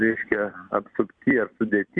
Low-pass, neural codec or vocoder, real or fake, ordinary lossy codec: 9.9 kHz; vocoder, 44.1 kHz, 128 mel bands every 256 samples, BigVGAN v2; fake; Opus, 64 kbps